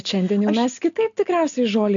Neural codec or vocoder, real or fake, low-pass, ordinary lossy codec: none; real; 7.2 kHz; MP3, 64 kbps